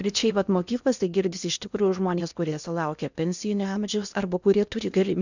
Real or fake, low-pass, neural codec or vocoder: fake; 7.2 kHz; codec, 16 kHz in and 24 kHz out, 0.8 kbps, FocalCodec, streaming, 65536 codes